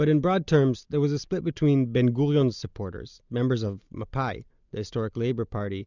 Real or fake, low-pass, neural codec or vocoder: real; 7.2 kHz; none